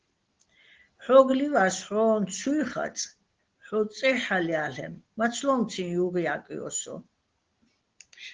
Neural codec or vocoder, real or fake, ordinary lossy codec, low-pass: none; real; Opus, 16 kbps; 7.2 kHz